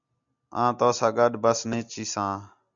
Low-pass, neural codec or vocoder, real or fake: 7.2 kHz; none; real